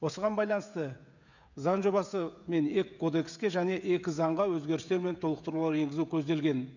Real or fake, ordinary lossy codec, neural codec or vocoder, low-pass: fake; none; codec, 16 kHz, 16 kbps, FreqCodec, smaller model; 7.2 kHz